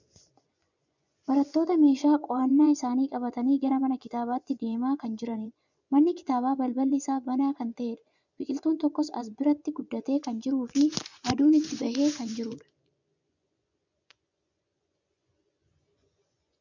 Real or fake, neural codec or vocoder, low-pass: fake; vocoder, 24 kHz, 100 mel bands, Vocos; 7.2 kHz